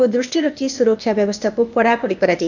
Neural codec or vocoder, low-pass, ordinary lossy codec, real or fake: codec, 16 kHz, 0.8 kbps, ZipCodec; 7.2 kHz; none; fake